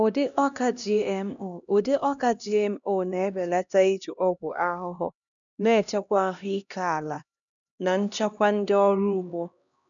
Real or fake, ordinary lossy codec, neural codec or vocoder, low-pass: fake; none; codec, 16 kHz, 1 kbps, X-Codec, HuBERT features, trained on LibriSpeech; 7.2 kHz